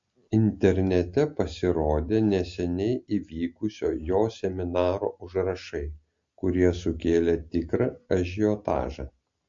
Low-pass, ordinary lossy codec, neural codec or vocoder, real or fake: 7.2 kHz; MP3, 48 kbps; none; real